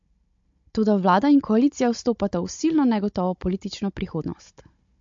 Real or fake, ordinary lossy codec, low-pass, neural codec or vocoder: fake; MP3, 48 kbps; 7.2 kHz; codec, 16 kHz, 16 kbps, FunCodec, trained on Chinese and English, 50 frames a second